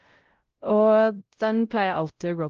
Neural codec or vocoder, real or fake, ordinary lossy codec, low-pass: codec, 16 kHz, 0.5 kbps, X-Codec, WavLM features, trained on Multilingual LibriSpeech; fake; Opus, 16 kbps; 7.2 kHz